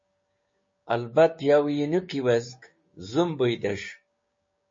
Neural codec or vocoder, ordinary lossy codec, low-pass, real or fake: codec, 16 kHz, 6 kbps, DAC; MP3, 32 kbps; 7.2 kHz; fake